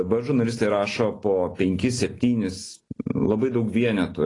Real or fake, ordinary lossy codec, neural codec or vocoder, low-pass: real; AAC, 32 kbps; none; 10.8 kHz